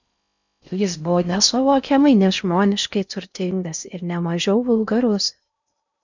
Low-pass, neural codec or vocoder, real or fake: 7.2 kHz; codec, 16 kHz in and 24 kHz out, 0.6 kbps, FocalCodec, streaming, 4096 codes; fake